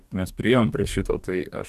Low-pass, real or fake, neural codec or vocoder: 14.4 kHz; fake; codec, 44.1 kHz, 2.6 kbps, DAC